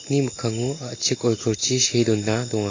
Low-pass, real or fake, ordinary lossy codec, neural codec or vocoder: 7.2 kHz; real; AAC, 32 kbps; none